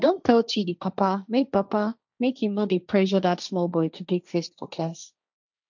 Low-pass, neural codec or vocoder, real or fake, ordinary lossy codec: 7.2 kHz; codec, 16 kHz, 1.1 kbps, Voila-Tokenizer; fake; none